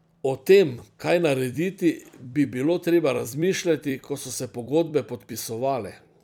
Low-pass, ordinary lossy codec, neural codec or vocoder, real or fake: 19.8 kHz; none; vocoder, 44.1 kHz, 128 mel bands every 256 samples, BigVGAN v2; fake